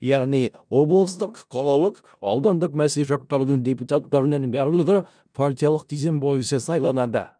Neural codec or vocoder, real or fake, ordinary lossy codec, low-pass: codec, 16 kHz in and 24 kHz out, 0.4 kbps, LongCat-Audio-Codec, four codebook decoder; fake; none; 9.9 kHz